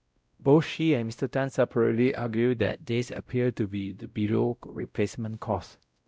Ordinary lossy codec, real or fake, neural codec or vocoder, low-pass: none; fake; codec, 16 kHz, 0.5 kbps, X-Codec, WavLM features, trained on Multilingual LibriSpeech; none